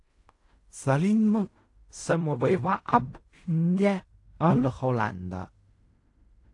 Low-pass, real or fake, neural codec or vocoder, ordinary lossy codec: 10.8 kHz; fake; codec, 16 kHz in and 24 kHz out, 0.4 kbps, LongCat-Audio-Codec, fine tuned four codebook decoder; AAC, 48 kbps